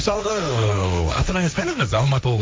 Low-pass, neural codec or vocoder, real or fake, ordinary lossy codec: none; codec, 16 kHz, 1.1 kbps, Voila-Tokenizer; fake; none